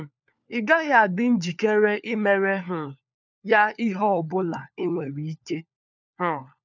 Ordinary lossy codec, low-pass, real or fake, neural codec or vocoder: none; 7.2 kHz; fake; codec, 16 kHz, 4 kbps, FunCodec, trained on LibriTTS, 50 frames a second